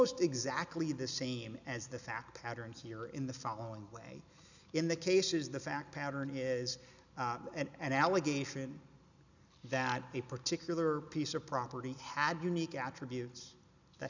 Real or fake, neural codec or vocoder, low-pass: real; none; 7.2 kHz